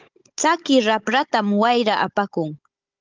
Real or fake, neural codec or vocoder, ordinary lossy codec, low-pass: fake; codec, 16 kHz, 16 kbps, FunCodec, trained on Chinese and English, 50 frames a second; Opus, 32 kbps; 7.2 kHz